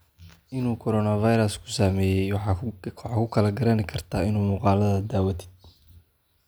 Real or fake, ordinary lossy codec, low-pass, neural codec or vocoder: real; none; none; none